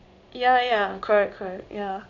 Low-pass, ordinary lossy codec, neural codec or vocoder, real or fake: 7.2 kHz; none; codec, 16 kHz in and 24 kHz out, 1 kbps, XY-Tokenizer; fake